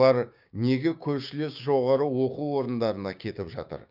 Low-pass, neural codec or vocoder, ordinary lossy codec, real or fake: 5.4 kHz; codec, 16 kHz, 6 kbps, DAC; none; fake